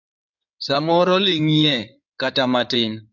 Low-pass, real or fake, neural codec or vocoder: 7.2 kHz; fake; codec, 16 kHz in and 24 kHz out, 2.2 kbps, FireRedTTS-2 codec